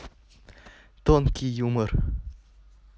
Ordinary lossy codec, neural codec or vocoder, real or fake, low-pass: none; none; real; none